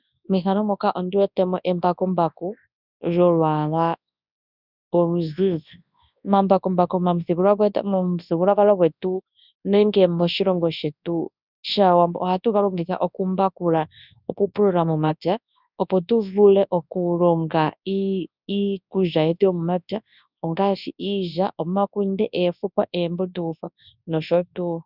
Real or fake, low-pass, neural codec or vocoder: fake; 5.4 kHz; codec, 24 kHz, 0.9 kbps, WavTokenizer, large speech release